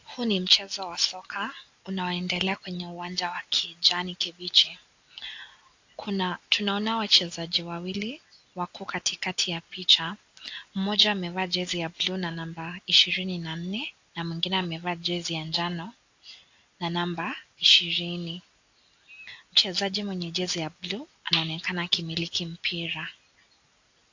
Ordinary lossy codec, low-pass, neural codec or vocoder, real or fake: AAC, 48 kbps; 7.2 kHz; none; real